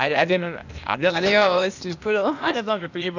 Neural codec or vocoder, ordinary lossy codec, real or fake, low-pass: codec, 16 kHz, 0.8 kbps, ZipCodec; none; fake; 7.2 kHz